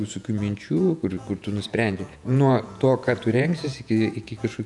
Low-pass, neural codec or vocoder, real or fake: 10.8 kHz; vocoder, 48 kHz, 128 mel bands, Vocos; fake